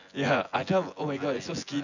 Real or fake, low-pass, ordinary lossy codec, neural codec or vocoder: fake; 7.2 kHz; none; vocoder, 24 kHz, 100 mel bands, Vocos